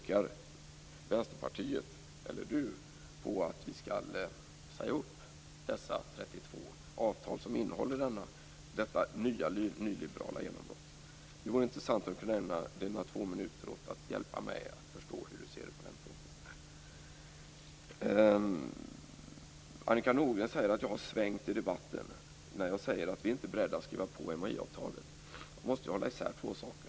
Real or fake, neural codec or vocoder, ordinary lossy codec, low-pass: real; none; none; none